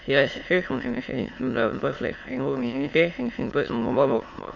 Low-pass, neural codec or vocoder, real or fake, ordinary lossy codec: 7.2 kHz; autoencoder, 22.05 kHz, a latent of 192 numbers a frame, VITS, trained on many speakers; fake; MP3, 48 kbps